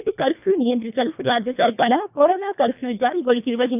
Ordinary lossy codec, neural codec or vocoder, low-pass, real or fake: none; codec, 24 kHz, 1.5 kbps, HILCodec; 3.6 kHz; fake